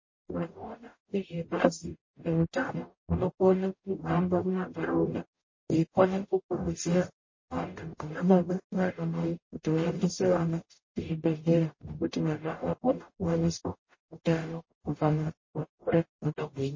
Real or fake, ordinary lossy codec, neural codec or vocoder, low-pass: fake; MP3, 32 kbps; codec, 44.1 kHz, 0.9 kbps, DAC; 7.2 kHz